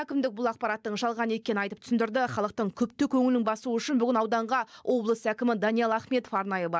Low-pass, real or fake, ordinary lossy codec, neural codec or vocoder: none; real; none; none